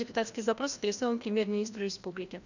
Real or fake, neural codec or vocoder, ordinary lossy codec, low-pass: fake; codec, 16 kHz, 1 kbps, FreqCodec, larger model; none; 7.2 kHz